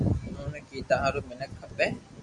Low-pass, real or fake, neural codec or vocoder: 10.8 kHz; real; none